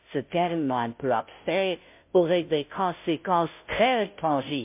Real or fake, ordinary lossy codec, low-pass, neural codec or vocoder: fake; MP3, 32 kbps; 3.6 kHz; codec, 16 kHz, 0.5 kbps, FunCodec, trained on Chinese and English, 25 frames a second